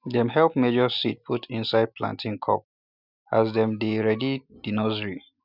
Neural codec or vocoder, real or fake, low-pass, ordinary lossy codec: none; real; 5.4 kHz; none